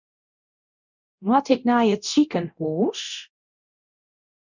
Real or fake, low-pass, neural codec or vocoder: fake; 7.2 kHz; codec, 24 kHz, 0.9 kbps, DualCodec